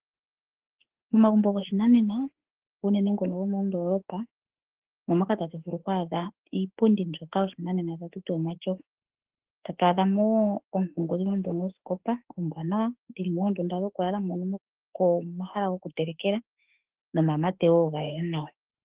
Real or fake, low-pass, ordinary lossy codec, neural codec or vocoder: fake; 3.6 kHz; Opus, 16 kbps; autoencoder, 48 kHz, 32 numbers a frame, DAC-VAE, trained on Japanese speech